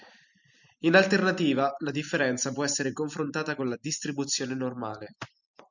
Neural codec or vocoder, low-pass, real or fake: none; 7.2 kHz; real